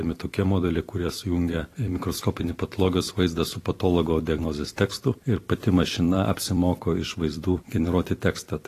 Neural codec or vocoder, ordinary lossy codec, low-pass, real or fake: vocoder, 44.1 kHz, 128 mel bands every 256 samples, BigVGAN v2; AAC, 48 kbps; 14.4 kHz; fake